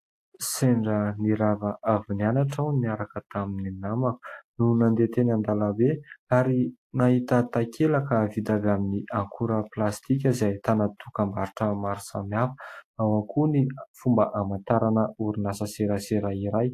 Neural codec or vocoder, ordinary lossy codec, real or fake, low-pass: none; AAC, 64 kbps; real; 14.4 kHz